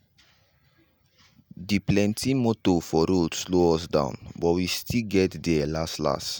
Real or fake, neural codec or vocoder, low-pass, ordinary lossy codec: real; none; none; none